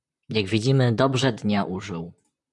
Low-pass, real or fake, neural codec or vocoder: 10.8 kHz; fake; vocoder, 44.1 kHz, 128 mel bands, Pupu-Vocoder